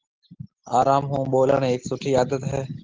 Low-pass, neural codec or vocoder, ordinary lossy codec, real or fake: 7.2 kHz; none; Opus, 16 kbps; real